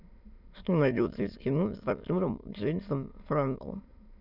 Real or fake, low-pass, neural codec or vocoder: fake; 5.4 kHz; autoencoder, 22.05 kHz, a latent of 192 numbers a frame, VITS, trained on many speakers